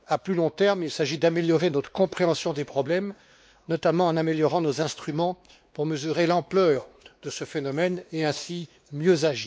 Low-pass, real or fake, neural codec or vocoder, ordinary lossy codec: none; fake; codec, 16 kHz, 2 kbps, X-Codec, WavLM features, trained on Multilingual LibriSpeech; none